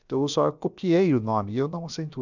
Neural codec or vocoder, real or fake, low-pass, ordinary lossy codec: codec, 16 kHz, about 1 kbps, DyCAST, with the encoder's durations; fake; 7.2 kHz; none